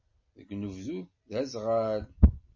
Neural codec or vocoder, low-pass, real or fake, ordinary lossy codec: none; 7.2 kHz; real; MP3, 32 kbps